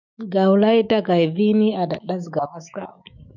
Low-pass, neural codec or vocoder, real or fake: 7.2 kHz; autoencoder, 48 kHz, 128 numbers a frame, DAC-VAE, trained on Japanese speech; fake